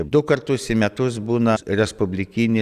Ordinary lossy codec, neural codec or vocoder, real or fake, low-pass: Opus, 64 kbps; none; real; 14.4 kHz